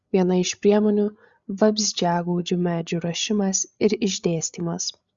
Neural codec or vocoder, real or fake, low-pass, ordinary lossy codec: codec, 16 kHz, 8 kbps, FreqCodec, larger model; fake; 7.2 kHz; Opus, 64 kbps